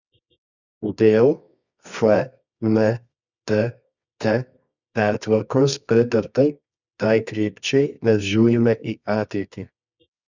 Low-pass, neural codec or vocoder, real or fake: 7.2 kHz; codec, 24 kHz, 0.9 kbps, WavTokenizer, medium music audio release; fake